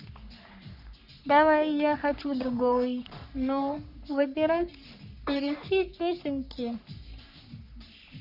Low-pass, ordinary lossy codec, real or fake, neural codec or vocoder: 5.4 kHz; none; fake; codec, 44.1 kHz, 3.4 kbps, Pupu-Codec